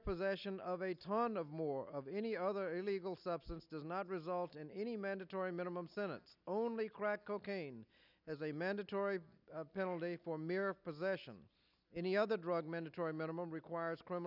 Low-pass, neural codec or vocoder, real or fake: 5.4 kHz; none; real